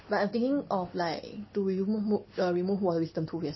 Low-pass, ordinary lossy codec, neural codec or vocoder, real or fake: 7.2 kHz; MP3, 24 kbps; none; real